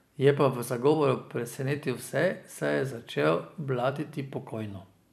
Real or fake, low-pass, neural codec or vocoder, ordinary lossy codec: fake; 14.4 kHz; vocoder, 44.1 kHz, 128 mel bands every 256 samples, BigVGAN v2; none